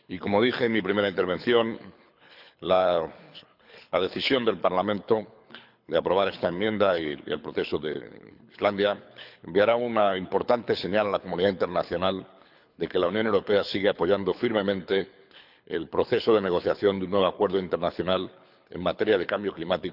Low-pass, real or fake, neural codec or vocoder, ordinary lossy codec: 5.4 kHz; fake; codec, 24 kHz, 6 kbps, HILCodec; none